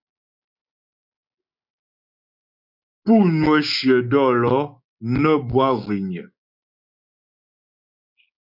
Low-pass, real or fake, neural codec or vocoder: 5.4 kHz; fake; codec, 16 kHz, 6 kbps, DAC